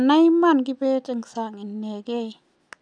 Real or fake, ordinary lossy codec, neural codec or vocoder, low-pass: real; none; none; 9.9 kHz